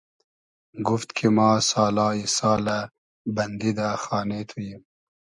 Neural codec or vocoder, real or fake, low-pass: none; real; 10.8 kHz